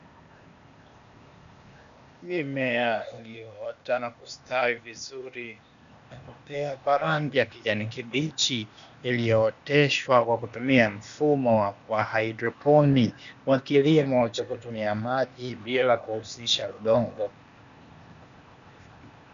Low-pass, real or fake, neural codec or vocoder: 7.2 kHz; fake; codec, 16 kHz, 0.8 kbps, ZipCodec